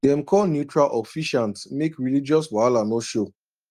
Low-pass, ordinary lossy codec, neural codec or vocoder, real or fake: 14.4 kHz; Opus, 32 kbps; none; real